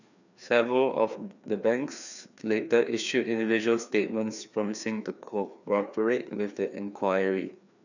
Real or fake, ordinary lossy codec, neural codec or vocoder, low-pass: fake; none; codec, 16 kHz, 2 kbps, FreqCodec, larger model; 7.2 kHz